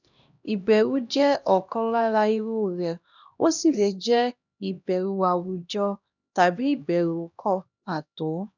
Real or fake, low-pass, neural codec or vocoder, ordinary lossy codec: fake; 7.2 kHz; codec, 16 kHz, 1 kbps, X-Codec, HuBERT features, trained on LibriSpeech; none